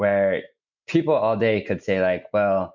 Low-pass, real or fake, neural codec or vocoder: 7.2 kHz; real; none